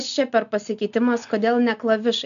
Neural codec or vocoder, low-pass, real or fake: none; 7.2 kHz; real